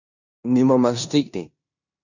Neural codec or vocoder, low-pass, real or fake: codec, 16 kHz in and 24 kHz out, 0.9 kbps, LongCat-Audio-Codec, four codebook decoder; 7.2 kHz; fake